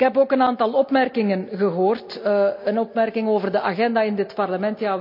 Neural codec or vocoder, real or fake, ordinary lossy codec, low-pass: none; real; MP3, 48 kbps; 5.4 kHz